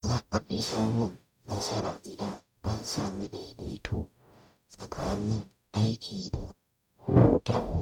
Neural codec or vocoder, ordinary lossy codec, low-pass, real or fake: codec, 44.1 kHz, 0.9 kbps, DAC; none; 19.8 kHz; fake